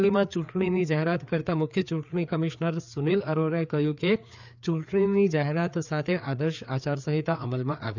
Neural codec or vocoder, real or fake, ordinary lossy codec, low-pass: codec, 16 kHz, 4 kbps, FreqCodec, larger model; fake; none; 7.2 kHz